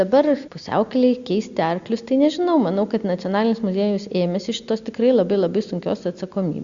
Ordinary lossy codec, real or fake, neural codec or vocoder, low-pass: Opus, 64 kbps; real; none; 7.2 kHz